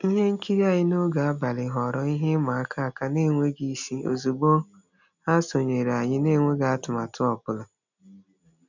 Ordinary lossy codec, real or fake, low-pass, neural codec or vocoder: none; real; 7.2 kHz; none